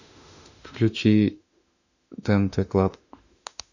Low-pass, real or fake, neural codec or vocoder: 7.2 kHz; fake; autoencoder, 48 kHz, 32 numbers a frame, DAC-VAE, trained on Japanese speech